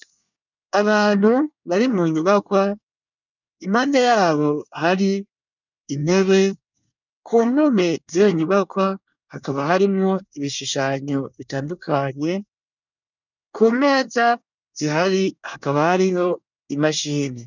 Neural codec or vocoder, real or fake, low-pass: codec, 24 kHz, 1 kbps, SNAC; fake; 7.2 kHz